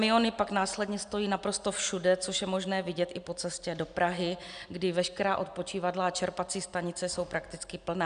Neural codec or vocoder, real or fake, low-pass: none; real; 9.9 kHz